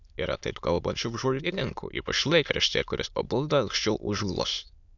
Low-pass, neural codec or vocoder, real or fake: 7.2 kHz; autoencoder, 22.05 kHz, a latent of 192 numbers a frame, VITS, trained on many speakers; fake